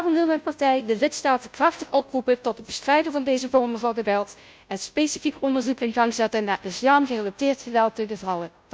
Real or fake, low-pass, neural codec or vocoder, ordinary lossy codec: fake; none; codec, 16 kHz, 0.5 kbps, FunCodec, trained on Chinese and English, 25 frames a second; none